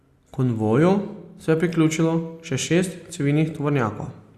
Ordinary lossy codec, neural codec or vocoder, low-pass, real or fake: Opus, 64 kbps; none; 14.4 kHz; real